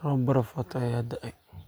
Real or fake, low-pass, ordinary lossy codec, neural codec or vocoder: real; none; none; none